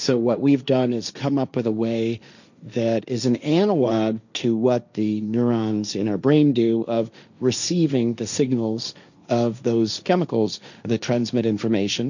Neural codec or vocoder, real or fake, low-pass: codec, 16 kHz, 1.1 kbps, Voila-Tokenizer; fake; 7.2 kHz